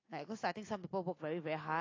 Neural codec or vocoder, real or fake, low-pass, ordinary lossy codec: none; real; 7.2 kHz; AAC, 32 kbps